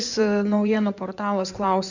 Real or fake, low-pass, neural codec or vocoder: fake; 7.2 kHz; codec, 16 kHz in and 24 kHz out, 2.2 kbps, FireRedTTS-2 codec